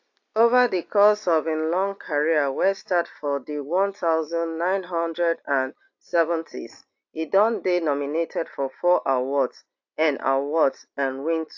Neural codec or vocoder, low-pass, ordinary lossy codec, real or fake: none; 7.2 kHz; AAC, 48 kbps; real